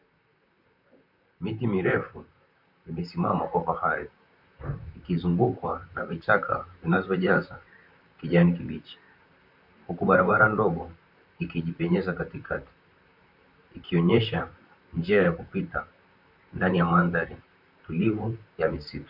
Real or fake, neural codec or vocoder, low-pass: fake; vocoder, 44.1 kHz, 128 mel bands, Pupu-Vocoder; 5.4 kHz